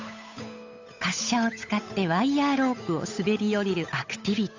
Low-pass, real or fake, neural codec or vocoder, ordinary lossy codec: 7.2 kHz; fake; codec, 16 kHz, 8 kbps, FunCodec, trained on Chinese and English, 25 frames a second; AAC, 48 kbps